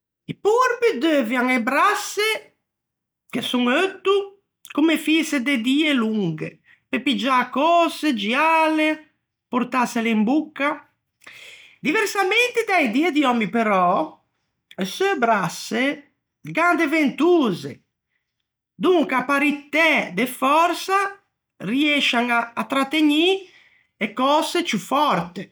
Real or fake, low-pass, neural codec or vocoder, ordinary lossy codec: real; none; none; none